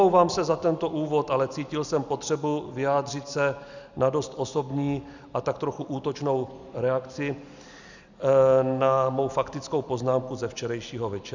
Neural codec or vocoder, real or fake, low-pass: none; real; 7.2 kHz